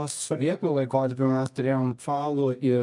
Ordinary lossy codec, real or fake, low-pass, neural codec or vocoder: MP3, 96 kbps; fake; 10.8 kHz; codec, 24 kHz, 0.9 kbps, WavTokenizer, medium music audio release